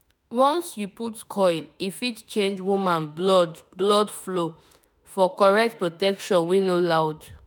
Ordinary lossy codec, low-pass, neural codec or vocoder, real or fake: none; none; autoencoder, 48 kHz, 32 numbers a frame, DAC-VAE, trained on Japanese speech; fake